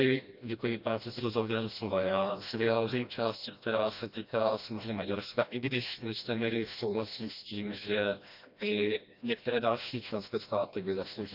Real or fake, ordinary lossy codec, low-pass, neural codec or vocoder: fake; none; 5.4 kHz; codec, 16 kHz, 1 kbps, FreqCodec, smaller model